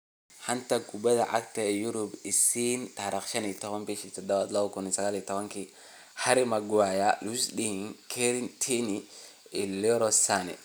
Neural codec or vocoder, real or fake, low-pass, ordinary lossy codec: none; real; none; none